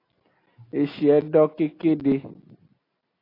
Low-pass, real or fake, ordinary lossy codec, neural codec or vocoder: 5.4 kHz; real; AAC, 24 kbps; none